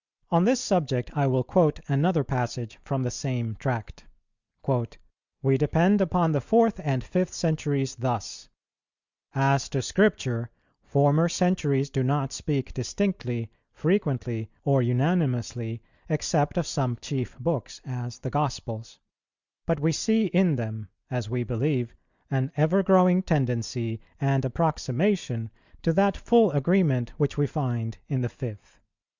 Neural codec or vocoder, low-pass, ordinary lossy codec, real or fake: none; 7.2 kHz; Opus, 64 kbps; real